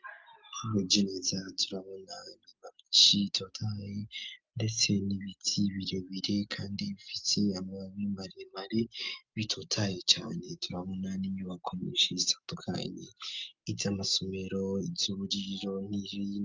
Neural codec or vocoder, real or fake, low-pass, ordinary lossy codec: none; real; 7.2 kHz; Opus, 24 kbps